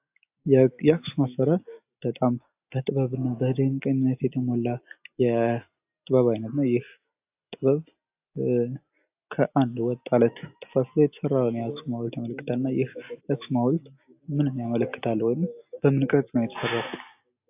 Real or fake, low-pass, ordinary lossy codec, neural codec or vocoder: real; 3.6 kHz; AAC, 32 kbps; none